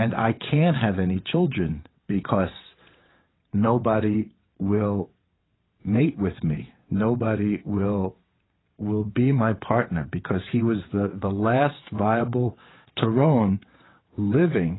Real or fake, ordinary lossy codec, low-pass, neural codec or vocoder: fake; AAC, 16 kbps; 7.2 kHz; vocoder, 22.05 kHz, 80 mel bands, WaveNeXt